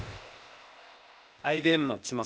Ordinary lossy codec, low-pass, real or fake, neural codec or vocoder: none; none; fake; codec, 16 kHz, 0.8 kbps, ZipCodec